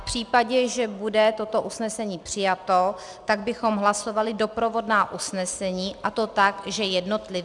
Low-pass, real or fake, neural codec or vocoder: 10.8 kHz; real; none